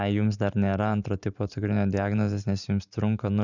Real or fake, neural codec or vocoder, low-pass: fake; vocoder, 44.1 kHz, 128 mel bands every 512 samples, BigVGAN v2; 7.2 kHz